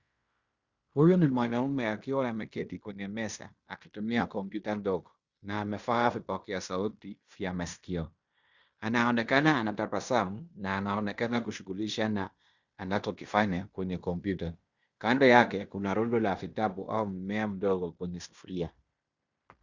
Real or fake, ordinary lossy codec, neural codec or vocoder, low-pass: fake; Opus, 64 kbps; codec, 16 kHz in and 24 kHz out, 0.9 kbps, LongCat-Audio-Codec, fine tuned four codebook decoder; 7.2 kHz